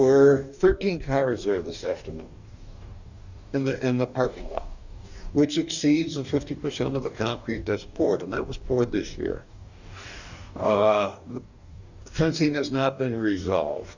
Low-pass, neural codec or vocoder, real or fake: 7.2 kHz; codec, 44.1 kHz, 2.6 kbps, DAC; fake